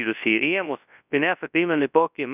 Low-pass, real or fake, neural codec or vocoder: 3.6 kHz; fake; codec, 24 kHz, 0.9 kbps, WavTokenizer, large speech release